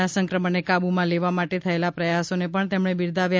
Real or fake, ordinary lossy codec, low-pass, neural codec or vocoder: real; none; none; none